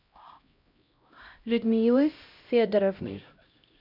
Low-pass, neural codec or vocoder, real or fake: 5.4 kHz; codec, 16 kHz, 0.5 kbps, X-Codec, HuBERT features, trained on LibriSpeech; fake